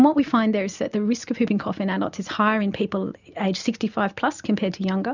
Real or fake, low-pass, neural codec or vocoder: real; 7.2 kHz; none